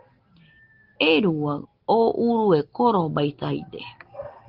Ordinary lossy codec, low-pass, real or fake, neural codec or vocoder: Opus, 16 kbps; 5.4 kHz; real; none